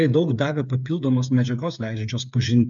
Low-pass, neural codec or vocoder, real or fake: 7.2 kHz; codec, 16 kHz, 8 kbps, FreqCodec, smaller model; fake